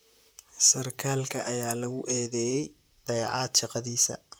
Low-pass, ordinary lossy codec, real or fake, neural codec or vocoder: none; none; fake; vocoder, 44.1 kHz, 128 mel bands, Pupu-Vocoder